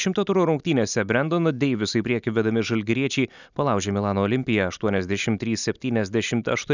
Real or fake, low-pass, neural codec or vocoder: real; 7.2 kHz; none